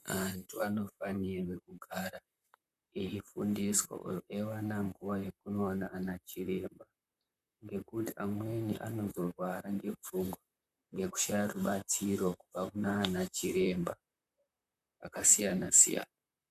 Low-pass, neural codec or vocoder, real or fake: 14.4 kHz; vocoder, 44.1 kHz, 128 mel bands, Pupu-Vocoder; fake